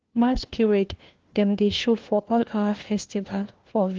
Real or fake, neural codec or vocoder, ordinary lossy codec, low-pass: fake; codec, 16 kHz, 1 kbps, FunCodec, trained on LibriTTS, 50 frames a second; Opus, 16 kbps; 7.2 kHz